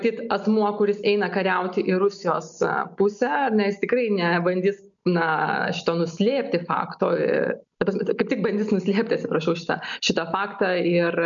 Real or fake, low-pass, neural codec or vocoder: real; 7.2 kHz; none